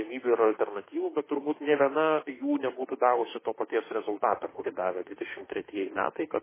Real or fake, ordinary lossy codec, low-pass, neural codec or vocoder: fake; MP3, 16 kbps; 3.6 kHz; autoencoder, 48 kHz, 32 numbers a frame, DAC-VAE, trained on Japanese speech